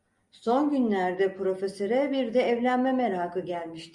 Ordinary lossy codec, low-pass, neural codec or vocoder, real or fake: Opus, 64 kbps; 10.8 kHz; none; real